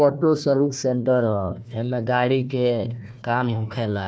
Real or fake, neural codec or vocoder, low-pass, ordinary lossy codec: fake; codec, 16 kHz, 1 kbps, FunCodec, trained on Chinese and English, 50 frames a second; none; none